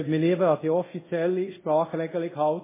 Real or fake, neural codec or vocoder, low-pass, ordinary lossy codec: fake; codec, 24 kHz, 0.5 kbps, DualCodec; 3.6 kHz; MP3, 16 kbps